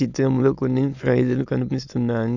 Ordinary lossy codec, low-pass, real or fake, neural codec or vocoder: none; 7.2 kHz; fake; autoencoder, 22.05 kHz, a latent of 192 numbers a frame, VITS, trained on many speakers